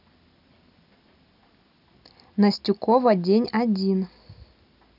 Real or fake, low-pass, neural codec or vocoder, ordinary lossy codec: real; 5.4 kHz; none; none